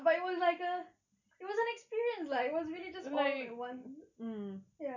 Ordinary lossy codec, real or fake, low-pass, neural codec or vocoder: none; real; 7.2 kHz; none